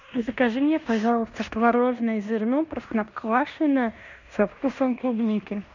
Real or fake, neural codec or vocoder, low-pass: fake; codec, 16 kHz in and 24 kHz out, 0.9 kbps, LongCat-Audio-Codec, fine tuned four codebook decoder; 7.2 kHz